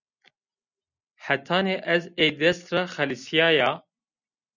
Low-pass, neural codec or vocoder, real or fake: 7.2 kHz; none; real